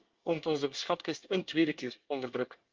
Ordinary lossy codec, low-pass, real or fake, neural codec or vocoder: Opus, 32 kbps; 7.2 kHz; fake; codec, 24 kHz, 1 kbps, SNAC